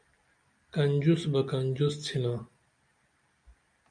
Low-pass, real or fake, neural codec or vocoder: 9.9 kHz; real; none